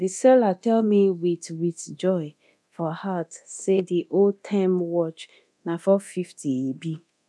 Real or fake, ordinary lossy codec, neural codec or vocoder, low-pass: fake; none; codec, 24 kHz, 0.9 kbps, DualCodec; none